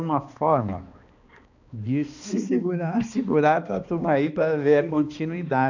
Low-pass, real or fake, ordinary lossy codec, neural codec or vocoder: 7.2 kHz; fake; none; codec, 16 kHz, 2 kbps, X-Codec, HuBERT features, trained on balanced general audio